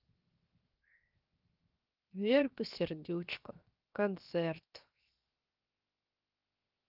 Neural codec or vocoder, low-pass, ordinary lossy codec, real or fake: codec, 16 kHz, 0.7 kbps, FocalCodec; 5.4 kHz; Opus, 24 kbps; fake